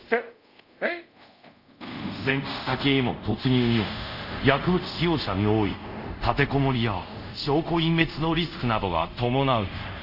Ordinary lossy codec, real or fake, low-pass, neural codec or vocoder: none; fake; 5.4 kHz; codec, 24 kHz, 0.5 kbps, DualCodec